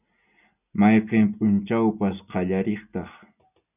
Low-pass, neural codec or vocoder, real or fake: 3.6 kHz; none; real